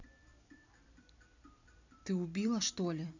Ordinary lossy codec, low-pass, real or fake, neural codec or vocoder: none; 7.2 kHz; fake; vocoder, 44.1 kHz, 128 mel bands every 256 samples, BigVGAN v2